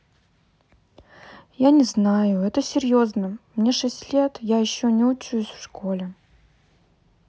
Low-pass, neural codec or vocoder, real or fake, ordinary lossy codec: none; none; real; none